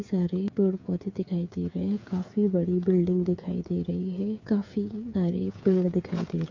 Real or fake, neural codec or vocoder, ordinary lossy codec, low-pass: fake; codec, 16 kHz, 8 kbps, FreqCodec, smaller model; MP3, 48 kbps; 7.2 kHz